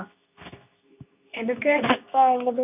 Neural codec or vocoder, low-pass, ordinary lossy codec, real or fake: codec, 24 kHz, 0.9 kbps, WavTokenizer, medium speech release version 2; 3.6 kHz; none; fake